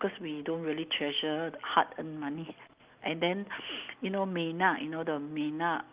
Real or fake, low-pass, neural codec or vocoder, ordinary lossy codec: real; 3.6 kHz; none; Opus, 32 kbps